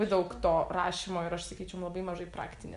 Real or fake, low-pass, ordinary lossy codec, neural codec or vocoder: real; 10.8 kHz; MP3, 64 kbps; none